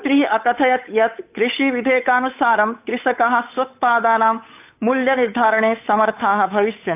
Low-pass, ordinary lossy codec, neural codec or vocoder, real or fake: 3.6 kHz; AAC, 32 kbps; codec, 16 kHz, 8 kbps, FunCodec, trained on Chinese and English, 25 frames a second; fake